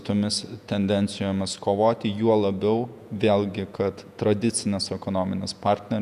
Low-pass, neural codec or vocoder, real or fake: 14.4 kHz; vocoder, 48 kHz, 128 mel bands, Vocos; fake